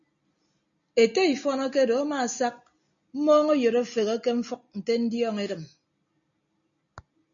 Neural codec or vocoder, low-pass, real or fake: none; 7.2 kHz; real